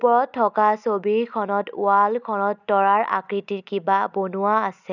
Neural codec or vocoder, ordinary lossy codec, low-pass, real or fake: none; none; 7.2 kHz; real